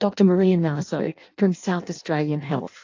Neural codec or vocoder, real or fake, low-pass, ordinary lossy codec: codec, 16 kHz in and 24 kHz out, 0.6 kbps, FireRedTTS-2 codec; fake; 7.2 kHz; AAC, 48 kbps